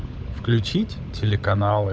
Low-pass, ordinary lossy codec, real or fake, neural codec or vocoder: none; none; fake; codec, 16 kHz, 16 kbps, FunCodec, trained on LibriTTS, 50 frames a second